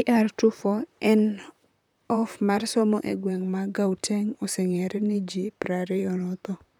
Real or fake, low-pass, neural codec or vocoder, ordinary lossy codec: fake; 19.8 kHz; vocoder, 44.1 kHz, 128 mel bands, Pupu-Vocoder; none